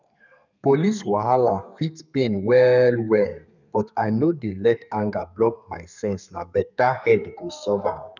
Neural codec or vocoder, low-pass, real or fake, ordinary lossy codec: codec, 32 kHz, 1.9 kbps, SNAC; 7.2 kHz; fake; none